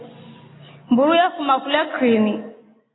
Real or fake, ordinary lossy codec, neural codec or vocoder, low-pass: real; AAC, 16 kbps; none; 7.2 kHz